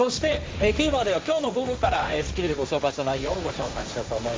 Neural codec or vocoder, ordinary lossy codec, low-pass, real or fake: codec, 16 kHz, 1.1 kbps, Voila-Tokenizer; none; none; fake